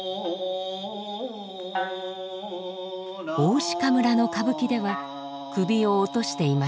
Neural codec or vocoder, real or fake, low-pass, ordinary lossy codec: none; real; none; none